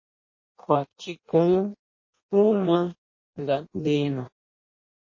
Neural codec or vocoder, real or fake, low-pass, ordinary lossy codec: codec, 16 kHz in and 24 kHz out, 0.6 kbps, FireRedTTS-2 codec; fake; 7.2 kHz; MP3, 32 kbps